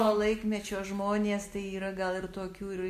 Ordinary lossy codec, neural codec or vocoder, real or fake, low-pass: MP3, 64 kbps; none; real; 14.4 kHz